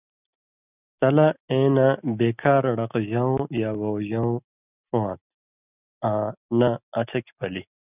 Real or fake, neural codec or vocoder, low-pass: real; none; 3.6 kHz